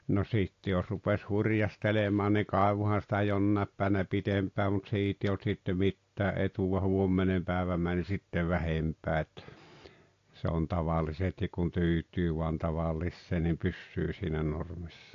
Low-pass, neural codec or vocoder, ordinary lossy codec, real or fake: 7.2 kHz; none; AAC, 48 kbps; real